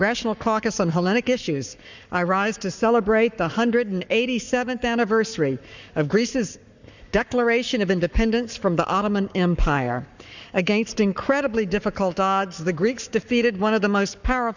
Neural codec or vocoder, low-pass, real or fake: codec, 44.1 kHz, 7.8 kbps, Pupu-Codec; 7.2 kHz; fake